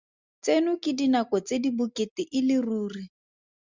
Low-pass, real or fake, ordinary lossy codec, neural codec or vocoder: 7.2 kHz; real; Opus, 64 kbps; none